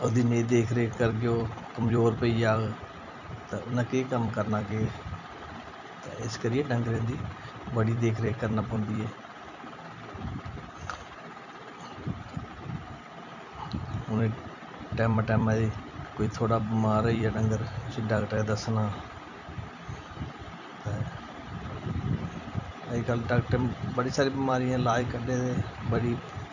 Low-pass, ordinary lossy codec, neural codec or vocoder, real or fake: 7.2 kHz; none; vocoder, 44.1 kHz, 128 mel bands every 256 samples, BigVGAN v2; fake